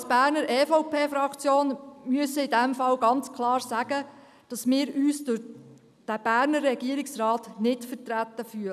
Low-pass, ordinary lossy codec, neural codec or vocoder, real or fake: 14.4 kHz; none; none; real